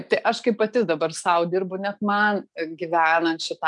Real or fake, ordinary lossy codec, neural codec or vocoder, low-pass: real; MP3, 96 kbps; none; 10.8 kHz